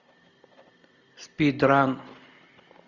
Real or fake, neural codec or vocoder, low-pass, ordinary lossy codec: real; none; 7.2 kHz; Opus, 64 kbps